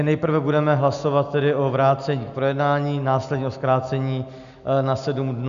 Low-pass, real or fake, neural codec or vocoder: 7.2 kHz; real; none